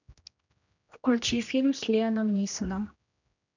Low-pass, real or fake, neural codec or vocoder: 7.2 kHz; fake; codec, 16 kHz, 1 kbps, X-Codec, HuBERT features, trained on general audio